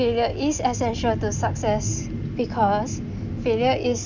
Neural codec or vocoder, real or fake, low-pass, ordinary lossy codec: none; real; 7.2 kHz; Opus, 64 kbps